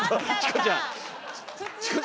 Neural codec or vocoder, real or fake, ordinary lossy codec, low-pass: none; real; none; none